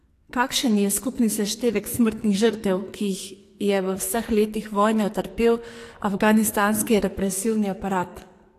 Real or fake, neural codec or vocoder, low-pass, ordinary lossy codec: fake; codec, 44.1 kHz, 2.6 kbps, SNAC; 14.4 kHz; AAC, 64 kbps